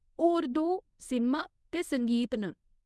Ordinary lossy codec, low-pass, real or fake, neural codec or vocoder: none; none; fake; codec, 24 kHz, 0.9 kbps, WavTokenizer, medium speech release version 1